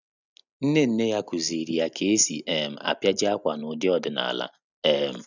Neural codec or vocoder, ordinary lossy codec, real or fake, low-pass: none; none; real; 7.2 kHz